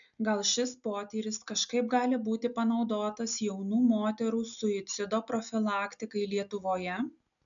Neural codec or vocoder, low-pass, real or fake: none; 7.2 kHz; real